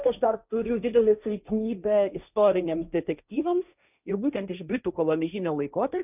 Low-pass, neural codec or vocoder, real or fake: 3.6 kHz; codec, 16 kHz, 1.1 kbps, Voila-Tokenizer; fake